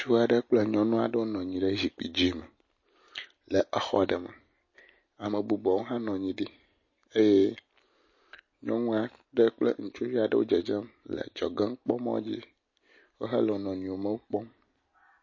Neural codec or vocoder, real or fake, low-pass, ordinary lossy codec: none; real; 7.2 kHz; MP3, 32 kbps